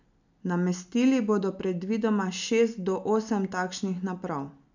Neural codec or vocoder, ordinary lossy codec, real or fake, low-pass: none; none; real; 7.2 kHz